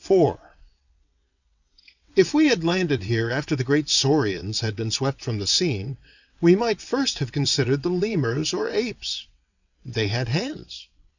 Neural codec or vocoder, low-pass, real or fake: vocoder, 22.05 kHz, 80 mel bands, WaveNeXt; 7.2 kHz; fake